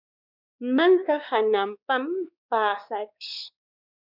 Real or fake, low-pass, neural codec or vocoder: fake; 5.4 kHz; codec, 16 kHz, 2 kbps, X-Codec, WavLM features, trained on Multilingual LibriSpeech